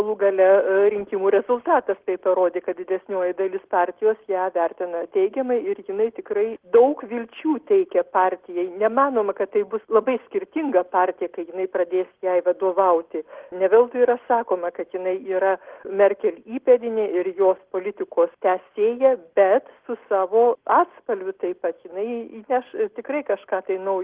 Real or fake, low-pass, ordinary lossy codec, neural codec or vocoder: real; 3.6 kHz; Opus, 16 kbps; none